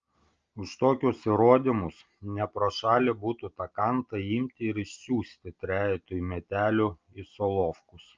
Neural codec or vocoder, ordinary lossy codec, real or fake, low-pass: none; Opus, 24 kbps; real; 7.2 kHz